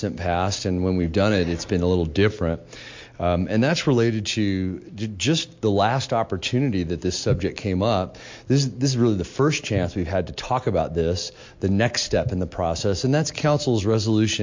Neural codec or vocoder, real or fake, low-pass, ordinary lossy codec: none; real; 7.2 kHz; MP3, 48 kbps